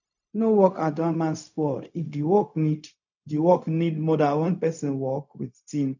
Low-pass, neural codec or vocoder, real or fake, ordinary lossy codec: 7.2 kHz; codec, 16 kHz, 0.4 kbps, LongCat-Audio-Codec; fake; none